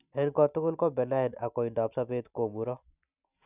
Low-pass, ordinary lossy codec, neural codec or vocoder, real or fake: 3.6 kHz; none; none; real